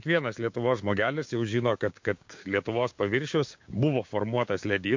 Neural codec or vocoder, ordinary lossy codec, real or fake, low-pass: codec, 16 kHz, 6 kbps, DAC; MP3, 48 kbps; fake; 7.2 kHz